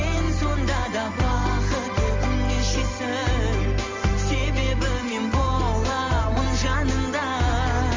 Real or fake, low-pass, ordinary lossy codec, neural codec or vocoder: real; 7.2 kHz; Opus, 32 kbps; none